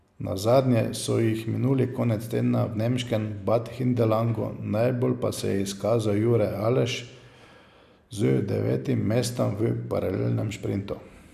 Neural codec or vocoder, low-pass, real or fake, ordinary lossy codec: none; 14.4 kHz; real; none